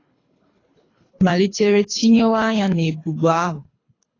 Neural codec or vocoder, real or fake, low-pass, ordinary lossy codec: codec, 24 kHz, 3 kbps, HILCodec; fake; 7.2 kHz; AAC, 32 kbps